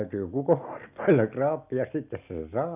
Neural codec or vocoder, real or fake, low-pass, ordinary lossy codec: none; real; 3.6 kHz; none